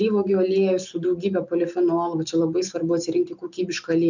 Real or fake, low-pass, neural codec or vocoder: real; 7.2 kHz; none